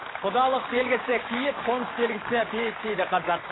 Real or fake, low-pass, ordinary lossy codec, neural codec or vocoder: fake; 7.2 kHz; AAC, 16 kbps; codec, 16 kHz, 6 kbps, DAC